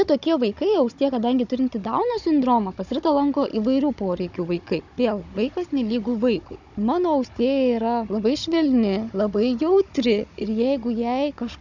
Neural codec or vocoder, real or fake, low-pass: codec, 16 kHz, 16 kbps, FunCodec, trained on Chinese and English, 50 frames a second; fake; 7.2 kHz